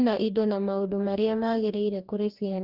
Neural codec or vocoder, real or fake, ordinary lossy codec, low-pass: codec, 44.1 kHz, 2.6 kbps, DAC; fake; Opus, 32 kbps; 5.4 kHz